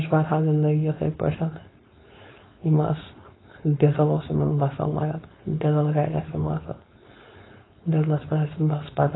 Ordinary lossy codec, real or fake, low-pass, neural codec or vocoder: AAC, 16 kbps; fake; 7.2 kHz; codec, 16 kHz, 4.8 kbps, FACodec